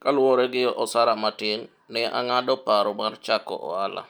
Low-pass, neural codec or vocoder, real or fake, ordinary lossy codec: none; none; real; none